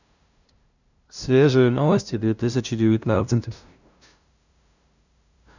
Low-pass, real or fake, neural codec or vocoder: 7.2 kHz; fake; codec, 16 kHz, 0.5 kbps, FunCodec, trained on LibriTTS, 25 frames a second